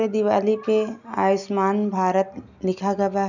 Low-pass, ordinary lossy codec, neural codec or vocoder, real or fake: 7.2 kHz; none; none; real